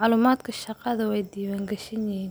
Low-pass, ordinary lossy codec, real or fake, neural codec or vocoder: none; none; real; none